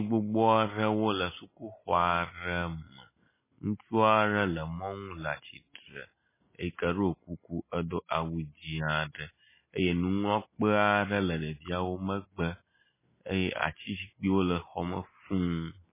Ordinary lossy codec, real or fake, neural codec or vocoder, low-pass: MP3, 16 kbps; real; none; 3.6 kHz